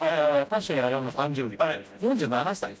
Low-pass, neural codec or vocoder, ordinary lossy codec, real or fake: none; codec, 16 kHz, 0.5 kbps, FreqCodec, smaller model; none; fake